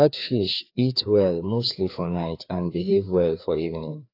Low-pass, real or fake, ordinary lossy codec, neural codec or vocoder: 5.4 kHz; fake; AAC, 32 kbps; codec, 16 kHz, 2 kbps, FreqCodec, larger model